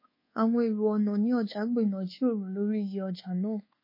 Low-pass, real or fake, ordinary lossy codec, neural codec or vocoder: 5.4 kHz; fake; MP3, 24 kbps; codec, 24 kHz, 1.2 kbps, DualCodec